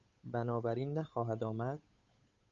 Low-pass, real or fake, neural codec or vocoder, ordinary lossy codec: 7.2 kHz; fake; codec, 16 kHz, 8 kbps, FunCodec, trained on Chinese and English, 25 frames a second; Opus, 64 kbps